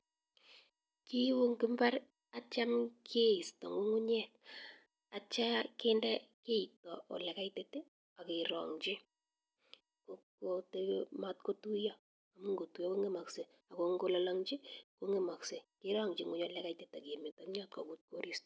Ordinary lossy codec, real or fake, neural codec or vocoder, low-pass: none; real; none; none